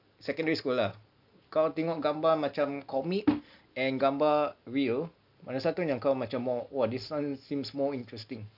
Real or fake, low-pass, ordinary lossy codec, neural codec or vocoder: real; 5.4 kHz; none; none